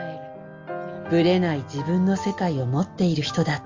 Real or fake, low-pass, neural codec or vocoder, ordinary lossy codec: real; 7.2 kHz; none; Opus, 32 kbps